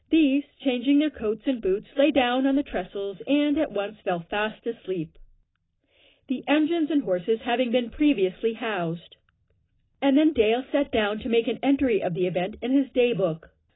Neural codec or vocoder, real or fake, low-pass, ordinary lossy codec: none; real; 7.2 kHz; AAC, 16 kbps